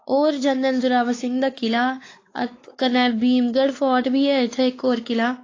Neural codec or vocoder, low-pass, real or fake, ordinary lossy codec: codec, 16 kHz, 4 kbps, X-Codec, WavLM features, trained on Multilingual LibriSpeech; 7.2 kHz; fake; AAC, 32 kbps